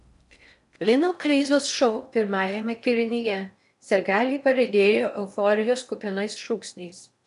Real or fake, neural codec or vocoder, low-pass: fake; codec, 16 kHz in and 24 kHz out, 0.6 kbps, FocalCodec, streaming, 4096 codes; 10.8 kHz